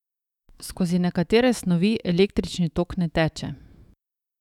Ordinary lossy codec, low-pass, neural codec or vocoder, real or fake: none; 19.8 kHz; autoencoder, 48 kHz, 128 numbers a frame, DAC-VAE, trained on Japanese speech; fake